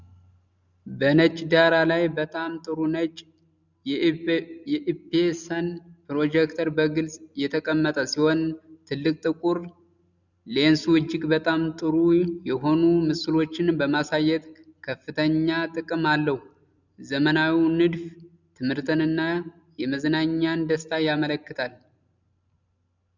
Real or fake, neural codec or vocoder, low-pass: real; none; 7.2 kHz